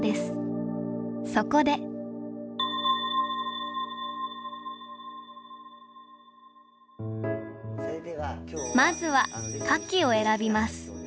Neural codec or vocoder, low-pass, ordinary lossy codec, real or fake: none; none; none; real